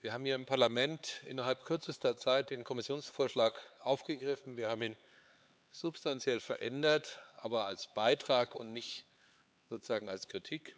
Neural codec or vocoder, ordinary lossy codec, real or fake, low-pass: codec, 16 kHz, 4 kbps, X-Codec, HuBERT features, trained on LibriSpeech; none; fake; none